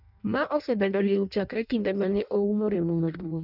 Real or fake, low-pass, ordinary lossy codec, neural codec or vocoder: fake; 5.4 kHz; none; codec, 16 kHz in and 24 kHz out, 0.6 kbps, FireRedTTS-2 codec